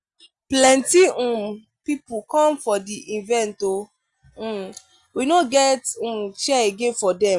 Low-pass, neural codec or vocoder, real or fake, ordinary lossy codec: 10.8 kHz; none; real; Opus, 64 kbps